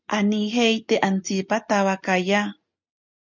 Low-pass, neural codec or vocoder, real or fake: 7.2 kHz; none; real